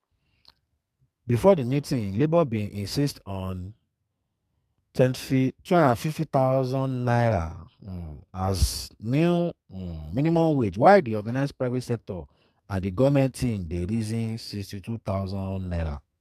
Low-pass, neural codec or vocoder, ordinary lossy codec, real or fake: 14.4 kHz; codec, 44.1 kHz, 2.6 kbps, SNAC; MP3, 96 kbps; fake